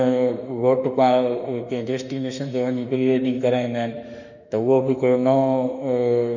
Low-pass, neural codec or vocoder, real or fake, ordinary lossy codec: 7.2 kHz; autoencoder, 48 kHz, 32 numbers a frame, DAC-VAE, trained on Japanese speech; fake; none